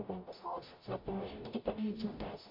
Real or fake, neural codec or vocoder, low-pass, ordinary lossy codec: fake; codec, 44.1 kHz, 0.9 kbps, DAC; 5.4 kHz; MP3, 32 kbps